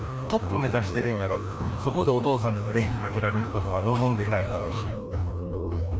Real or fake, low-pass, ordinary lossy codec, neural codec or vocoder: fake; none; none; codec, 16 kHz, 1 kbps, FreqCodec, larger model